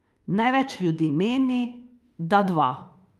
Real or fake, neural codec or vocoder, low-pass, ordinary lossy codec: fake; autoencoder, 48 kHz, 32 numbers a frame, DAC-VAE, trained on Japanese speech; 14.4 kHz; Opus, 32 kbps